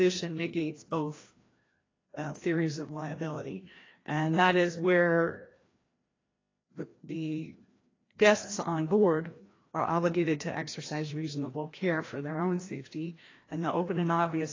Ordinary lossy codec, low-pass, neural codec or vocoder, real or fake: AAC, 32 kbps; 7.2 kHz; codec, 16 kHz, 1 kbps, FreqCodec, larger model; fake